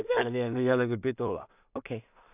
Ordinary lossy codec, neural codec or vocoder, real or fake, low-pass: none; codec, 16 kHz in and 24 kHz out, 0.4 kbps, LongCat-Audio-Codec, two codebook decoder; fake; 3.6 kHz